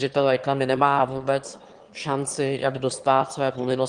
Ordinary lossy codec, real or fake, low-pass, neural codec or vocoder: Opus, 24 kbps; fake; 9.9 kHz; autoencoder, 22.05 kHz, a latent of 192 numbers a frame, VITS, trained on one speaker